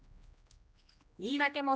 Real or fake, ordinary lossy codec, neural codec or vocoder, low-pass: fake; none; codec, 16 kHz, 1 kbps, X-Codec, HuBERT features, trained on general audio; none